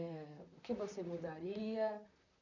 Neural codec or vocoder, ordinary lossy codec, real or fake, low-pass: vocoder, 44.1 kHz, 128 mel bands, Pupu-Vocoder; none; fake; 7.2 kHz